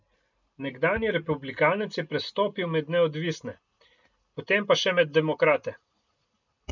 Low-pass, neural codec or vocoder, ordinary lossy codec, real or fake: 7.2 kHz; none; none; real